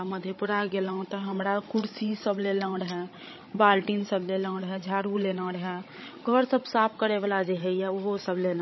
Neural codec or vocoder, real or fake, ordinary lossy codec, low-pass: codec, 16 kHz, 16 kbps, FreqCodec, larger model; fake; MP3, 24 kbps; 7.2 kHz